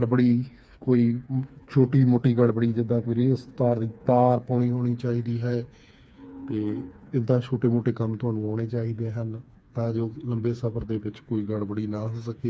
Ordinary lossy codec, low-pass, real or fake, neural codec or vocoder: none; none; fake; codec, 16 kHz, 4 kbps, FreqCodec, smaller model